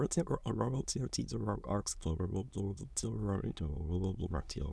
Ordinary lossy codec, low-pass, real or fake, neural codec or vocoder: none; none; fake; autoencoder, 22.05 kHz, a latent of 192 numbers a frame, VITS, trained on many speakers